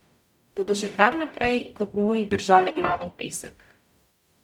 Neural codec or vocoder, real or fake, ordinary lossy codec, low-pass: codec, 44.1 kHz, 0.9 kbps, DAC; fake; none; 19.8 kHz